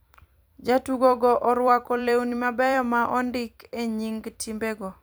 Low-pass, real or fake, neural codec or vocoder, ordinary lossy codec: none; fake; vocoder, 44.1 kHz, 128 mel bands every 256 samples, BigVGAN v2; none